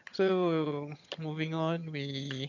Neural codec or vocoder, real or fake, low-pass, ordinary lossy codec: vocoder, 22.05 kHz, 80 mel bands, HiFi-GAN; fake; 7.2 kHz; none